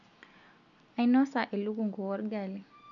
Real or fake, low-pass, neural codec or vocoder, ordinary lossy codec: real; 7.2 kHz; none; none